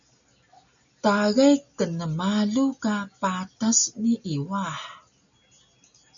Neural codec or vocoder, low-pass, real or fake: none; 7.2 kHz; real